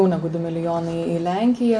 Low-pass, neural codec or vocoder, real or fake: 9.9 kHz; none; real